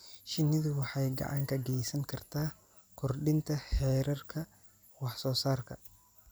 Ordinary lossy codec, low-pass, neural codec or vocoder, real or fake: none; none; none; real